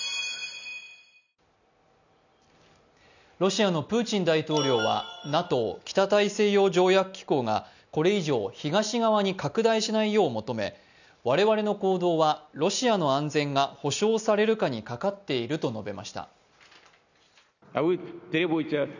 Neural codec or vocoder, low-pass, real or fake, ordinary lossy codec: none; 7.2 kHz; real; none